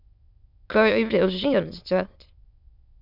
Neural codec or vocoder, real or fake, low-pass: autoencoder, 22.05 kHz, a latent of 192 numbers a frame, VITS, trained on many speakers; fake; 5.4 kHz